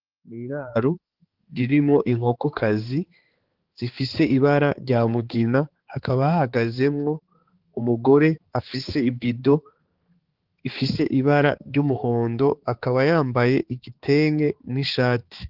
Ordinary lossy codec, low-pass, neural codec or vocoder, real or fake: Opus, 16 kbps; 5.4 kHz; codec, 16 kHz, 4 kbps, X-Codec, HuBERT features, trained on balanced general audio; fake